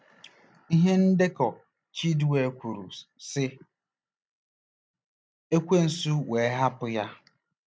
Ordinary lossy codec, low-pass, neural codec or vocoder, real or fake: none; none; none; real